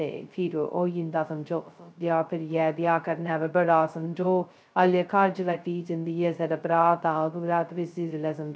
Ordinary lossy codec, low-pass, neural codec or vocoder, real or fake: none; none; codec, 16 kHz, 0.2 kbps, FocalCodec; fake